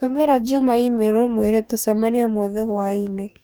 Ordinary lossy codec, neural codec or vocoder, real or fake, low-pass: none; codec, 44.1 kHz, 2.6 kbps, DAC; fake; none